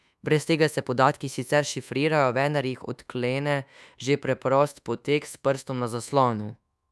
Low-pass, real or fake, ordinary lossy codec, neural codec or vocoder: none; fake; none; codec, 24 kHz, 1.2 kbps, DualCodec